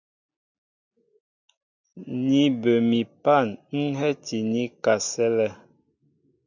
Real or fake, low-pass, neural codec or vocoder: real; 7.2 kHz; none